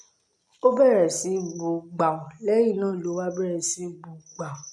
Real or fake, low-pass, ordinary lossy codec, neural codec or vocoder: real; none; none; none